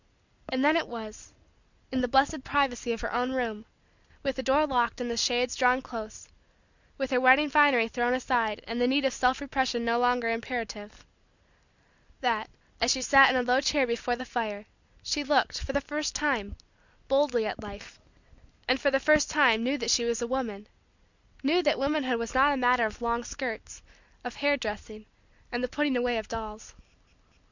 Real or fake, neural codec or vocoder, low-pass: real; none; 7.2 kHz